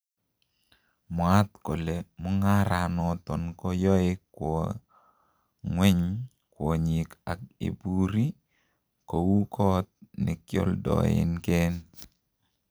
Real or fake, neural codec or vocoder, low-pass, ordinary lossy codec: real; none; none; none